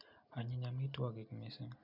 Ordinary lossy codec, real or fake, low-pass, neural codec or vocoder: none; real; 5.4 kHz; none